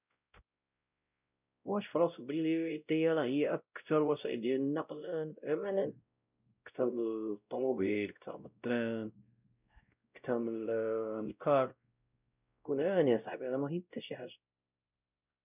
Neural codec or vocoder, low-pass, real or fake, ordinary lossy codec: codec, 16 kHz, 0.5 kbps, X-Codec, WavLM features, trained on Multilingual LibriSpeech; 3.6 kHz; fake; none